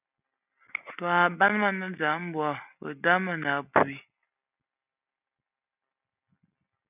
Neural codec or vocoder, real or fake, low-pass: none; real; 3.6 kHz